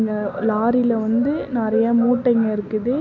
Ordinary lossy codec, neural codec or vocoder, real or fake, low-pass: MP3, 64 kbps; none; real; 7.2 kHz